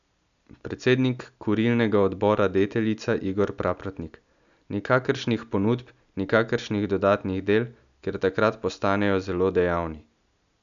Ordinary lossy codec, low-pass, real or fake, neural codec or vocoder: none; 7.2 kHz; real; none